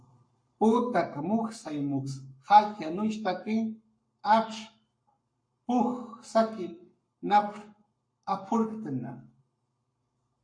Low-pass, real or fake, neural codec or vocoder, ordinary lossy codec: 9.9 kHz; fake; codec, 44.1 kHz, 7.8 kbps, Pupu-Codec; MP3, 48 kbps